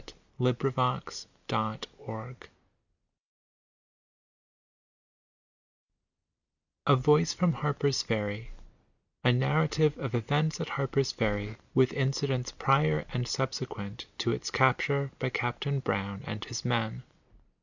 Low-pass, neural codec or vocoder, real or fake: 7.2 kHz; none; real